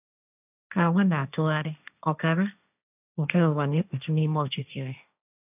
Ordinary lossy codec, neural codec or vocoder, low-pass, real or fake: none; codec, 16 kHz, 1.1 kbps, Voila-Tokenizer; 3.6 kHz; fake